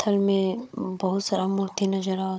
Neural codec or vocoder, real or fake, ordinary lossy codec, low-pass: codec, 16 kHz, 16 kbps, FunCodec, trained on Chinese and English, 50 frames a second; fake; none; none